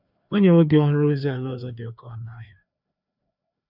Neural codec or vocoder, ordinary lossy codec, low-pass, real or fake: codec, 16 kHz, 2 kbps, FreqCodec, larger model; none; 5.4 kHz; fake